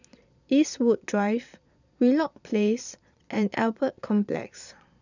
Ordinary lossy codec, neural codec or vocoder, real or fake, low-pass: none; vocoder, 22.05 kHz, 80 mel bands, Vocos; fake; 7.2 kHz